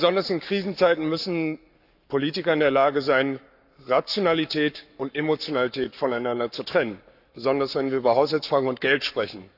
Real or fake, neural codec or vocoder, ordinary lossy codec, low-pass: fake; codec, 44.1 kHz, 7.8 kbps, Pupu-Codec; none; 5.4 kHz